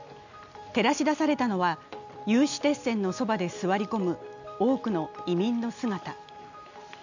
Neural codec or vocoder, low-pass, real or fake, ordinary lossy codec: none; 7.2 kHz; real; none